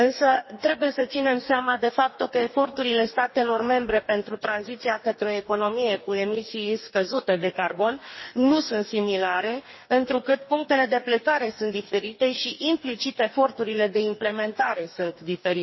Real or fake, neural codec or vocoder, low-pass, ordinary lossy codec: fake; codec, 44.1 kHz, 2.6 kbps, DAC; 7.2 kHz; MP3, 24 kbps